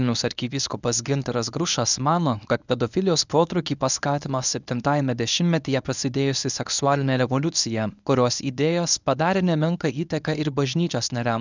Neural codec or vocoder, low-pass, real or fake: codec, 24 kHz, 0.9 kbps, WavTokenizer, medium speech release version 1; 7.2 kHz; fake